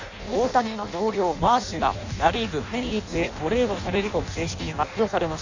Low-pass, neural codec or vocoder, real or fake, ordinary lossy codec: 7.2 kHz; codec, 16 kHz in and 24 kHz out, 0.6 kbps, FireRedTTS-2 codec; fake; Opus, 64 kbps